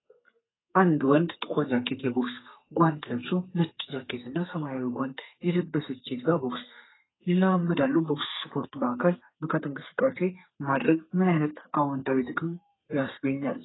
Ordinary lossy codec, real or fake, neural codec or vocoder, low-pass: AAC, 16 kbps; fake; codec, 32 kHz, 1.9 kbps, SNAC; 7.2 kHz